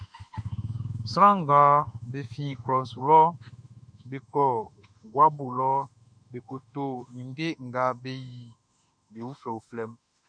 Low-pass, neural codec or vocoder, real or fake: 9.9 kHz; autoencoder, 48 kHz, 32 numbers a frame, DAC-VAE, trained on Japanese speech; fake